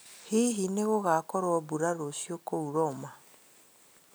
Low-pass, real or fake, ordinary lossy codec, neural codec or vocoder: none; real; none; none